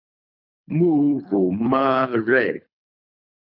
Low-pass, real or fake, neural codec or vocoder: 5.4 kHz; fake; codec, 24 kHz, 3 kbps, HILCodec